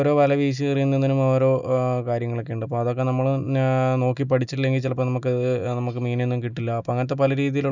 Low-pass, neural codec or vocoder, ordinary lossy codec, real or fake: 7.2 kHz; none; none; real